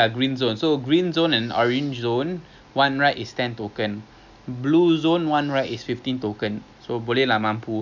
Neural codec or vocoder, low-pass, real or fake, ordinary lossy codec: none; 7.2 kHz; real; none